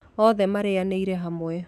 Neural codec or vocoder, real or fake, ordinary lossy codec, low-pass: autoencoder, 48 kHz, 128 numbers a frame, DAC-VAE, trained on Japanese speech; fake; none; 14.4 kHz